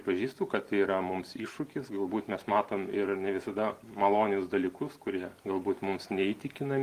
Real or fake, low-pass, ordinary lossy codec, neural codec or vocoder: real; 14.4 kHz; Opus, 24 kbps; none